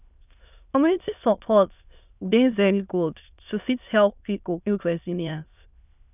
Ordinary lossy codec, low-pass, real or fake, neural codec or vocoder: none; 3.6 kHz; fake; autoencoder, 22.05 kHz, a latent of 192 numbers a frame, VITS, trained on many speakers